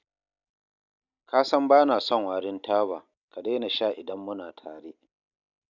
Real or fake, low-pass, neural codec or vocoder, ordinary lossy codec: real; 7.2 kHz; none; none